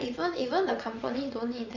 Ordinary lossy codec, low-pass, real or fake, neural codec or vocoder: none; 7.2 kHz; fake; vocoder, 22.05 kHz, 80 mel bands, WaveNeXt